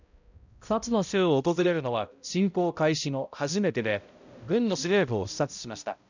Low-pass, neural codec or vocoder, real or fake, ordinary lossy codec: 7.2 kHz; codec, 16 kHz, 0.5 kbps, X-Codec, HuBERT features, trained on balanced general audio; fake; none